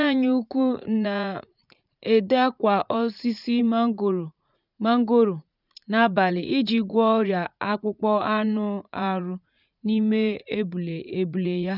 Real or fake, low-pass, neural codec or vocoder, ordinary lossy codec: fake; 5.4 kHz; vocoder, 44.1 kHz, 80 mel bands, Vocos; none